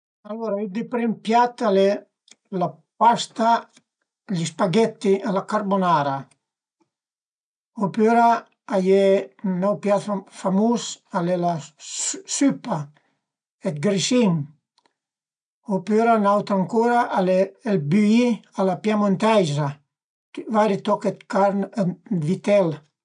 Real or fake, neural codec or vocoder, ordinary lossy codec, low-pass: real; none; none; 9.9 kHz